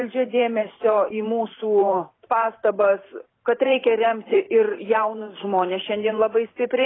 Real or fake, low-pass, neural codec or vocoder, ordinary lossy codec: fake; 7.2 kHz; vocoder, 44.1 kHz, 128 mel bands every 512 samples, BigVGAN v2; AAC, 16 kbps